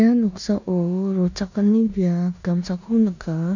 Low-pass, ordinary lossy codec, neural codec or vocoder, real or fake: 7.2 kHz; none; codec, 16 kHz in and 24 kHz out, 0.9 kbps, LongCat-Audio-Codec, four codebook decoder; fake